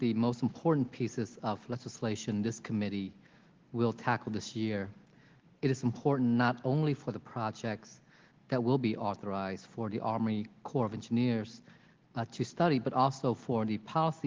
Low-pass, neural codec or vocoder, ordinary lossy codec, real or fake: 7.2 kHz; none; Opus, 16 kbps; real